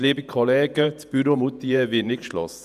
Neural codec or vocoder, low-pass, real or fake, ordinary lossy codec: vocoder, 48 kHz, 128 mel bands, Vocos; 14.4 kHz; fake; none